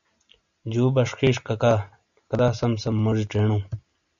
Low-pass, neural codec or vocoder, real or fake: 7.2 kHz; none; real